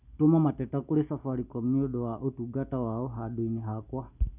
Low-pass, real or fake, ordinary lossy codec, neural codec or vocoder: 3.6 kHz; real; none; none